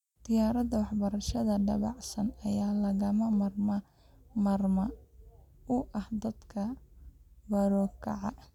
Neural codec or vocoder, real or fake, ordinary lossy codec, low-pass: none; real; none; 19.8 kHz